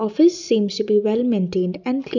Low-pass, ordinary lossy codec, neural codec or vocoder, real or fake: 7.2 kHz; none; none; real